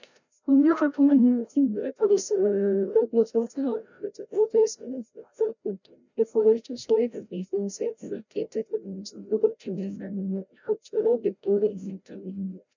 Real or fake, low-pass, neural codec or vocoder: fake; 7.2 kHz; codec, 16 kHz, 0.5 kbps, FreqCodec, larger model